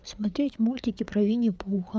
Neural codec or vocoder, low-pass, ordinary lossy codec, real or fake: codec, 16 kHz, 4 kbps, FreqCodec, larger model; none; none; fake